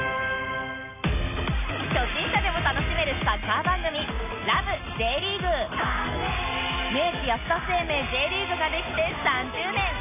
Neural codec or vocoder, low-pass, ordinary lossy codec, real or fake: none; 3.6 kHz; none; real